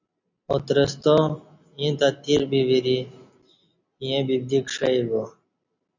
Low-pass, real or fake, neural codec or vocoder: 7.2 kHz; real; none